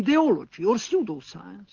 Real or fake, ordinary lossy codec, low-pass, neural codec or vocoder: real; Opus, 16 kbps; 7.2 kHz; none